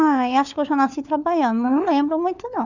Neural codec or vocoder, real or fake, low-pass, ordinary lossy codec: codec, 16 kHz, 4 kbps, FunCodec, trained on LibriTTS, 50 frames a second; fake; 7.2 kHz; none